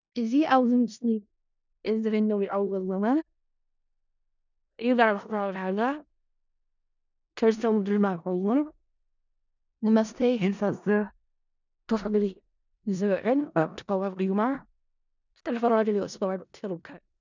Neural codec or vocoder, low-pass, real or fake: codec, 16 kHz in and 24 kHz out, 0.4 kbps, LongCat-Audio-Codec, four codebook decoder; 7.2 kHz; fake